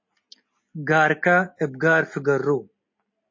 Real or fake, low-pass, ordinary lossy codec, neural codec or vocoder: real; 7.2 kHz; MP3, 32 kbps; none